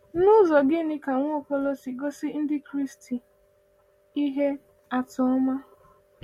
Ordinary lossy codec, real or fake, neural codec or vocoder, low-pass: MP3, 64 kbps; real; none; 19.8 kHz